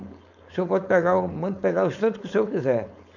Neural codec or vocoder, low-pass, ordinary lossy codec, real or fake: codec, 16 kHz, 4.8 kbps, FACodec; 7.2 kHz; none; fake